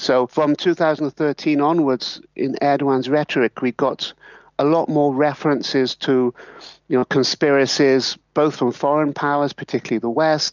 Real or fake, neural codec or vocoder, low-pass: real; none; 7.2 kHz